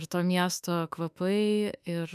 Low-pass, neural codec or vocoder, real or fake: 14.4 kHz; autoencoder, 48 kHz, 32 numbers a frame, DAC-VAE, trained on Japanese speech; fake